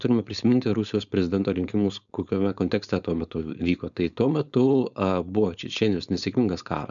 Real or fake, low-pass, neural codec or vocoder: fake; 7.2 kHz; codec, 16 kHz, 4.8 kbps, FACodec